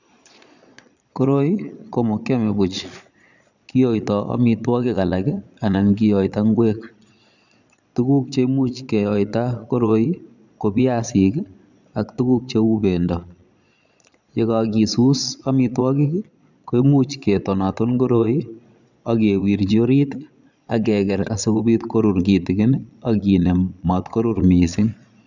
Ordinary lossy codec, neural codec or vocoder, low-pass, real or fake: none; vocoder, 22.05 kHz, 80 mel bands, Vocos; 7.2 kHz; fake